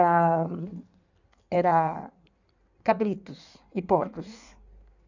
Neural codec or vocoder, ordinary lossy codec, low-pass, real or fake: codec, 16 kHz in and 24 kHz out, 1.1 kbps, FireRedTTS-2 codec; none; 7.2 kHz; fake